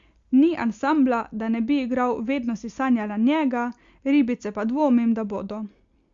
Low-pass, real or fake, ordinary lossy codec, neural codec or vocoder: 7.2 kHz; real; Opus, 64 kbps; none